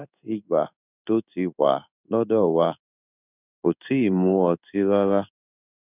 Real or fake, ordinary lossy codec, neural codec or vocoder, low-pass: fake; none; codec, 16 kHz in and 24 kHz out, 1 kbps, XY-Tokenizer; 3.6 kHz